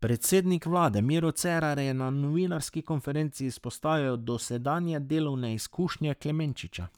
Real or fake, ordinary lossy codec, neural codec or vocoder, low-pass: fake; none; codec, 44.1 kHz, 7.8 kbps, Pupu-Codec; none